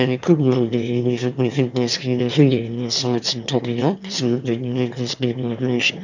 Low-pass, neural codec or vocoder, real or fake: 7.2 kHz; autoencoder, 22.05 kHz, a latent of 192 numbers a frame, VITS, trained on one speaker; fake